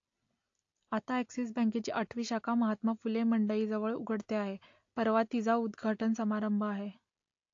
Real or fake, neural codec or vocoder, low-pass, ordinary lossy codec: real; none; 7.2 kHz; AAC, 48 kbps